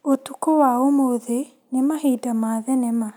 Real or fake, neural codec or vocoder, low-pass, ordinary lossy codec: real; none; none; none